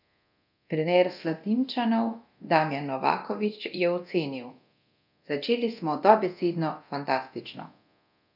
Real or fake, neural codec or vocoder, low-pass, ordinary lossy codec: fake; codec, 24 kHz, 0.9 kbps, DualCodec; 5.4 kHz; none